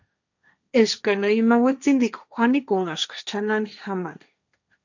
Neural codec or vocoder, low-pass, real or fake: codec, 16 kHz, 1.1 kbps, Voila-Tokenizer; 7.2 kHz; fake